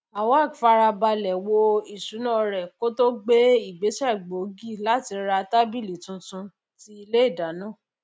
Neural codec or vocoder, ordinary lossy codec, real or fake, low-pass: none; none; real; none